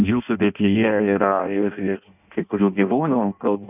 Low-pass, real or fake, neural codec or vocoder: 3.6 kHz; fake; codec, 16 kHz in and 24 kHz out, 0.6 kbps, FireRedTTS-2 codec